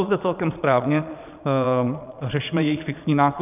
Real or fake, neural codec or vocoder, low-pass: fake; vocoder, 22.05 kHz, 80 mel bands, Vocos; 3.6 kHz